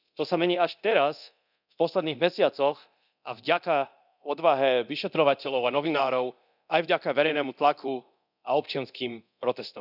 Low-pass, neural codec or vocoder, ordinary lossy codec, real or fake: 5.4 kHz; codec, 24 kHz, 0.9 kbps, DualCodec; none; fake